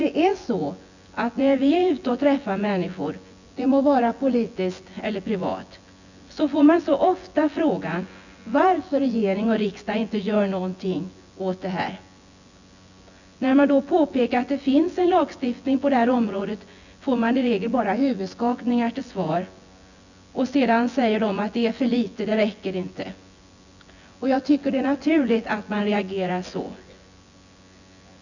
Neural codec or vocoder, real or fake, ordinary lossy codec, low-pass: vocoder, 24 kHz, 100 mel bands, Vocos; fake; none; 7.2 kHz